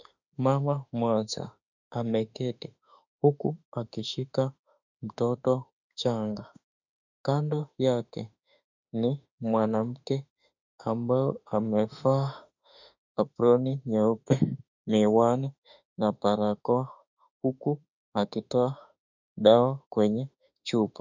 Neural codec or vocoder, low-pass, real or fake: autoencoder, 48 kHz, 32 numbers a frame, DAC-VAE, trained on Japanese speech; 7.2 kHz; fake